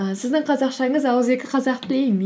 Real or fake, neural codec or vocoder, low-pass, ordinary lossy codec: real; none; none; none